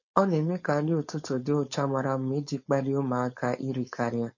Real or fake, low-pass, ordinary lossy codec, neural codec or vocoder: fake; 7.2 kHz; MP3, 32 kbps; codec, 16 kHz, 4.8 kbps, FACodec